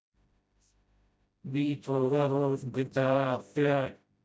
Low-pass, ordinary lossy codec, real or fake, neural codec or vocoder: none; none; fake; codec, 16 kHz, 0.5 kbps, FreqCodec, smaller model